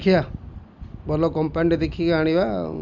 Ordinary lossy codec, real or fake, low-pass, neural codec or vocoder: none; real; 7.2 kHz; none